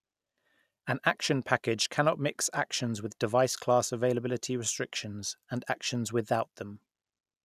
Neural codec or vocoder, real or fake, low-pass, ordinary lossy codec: none; real; 14.4 kHz; none